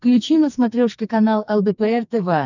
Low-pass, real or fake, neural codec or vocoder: 7.2 kHz; fake; codec, 44.1 kHz, 2.6 kbps, SNAC